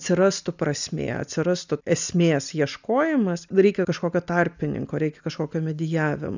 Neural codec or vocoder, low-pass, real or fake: none; 7.2 kHz; real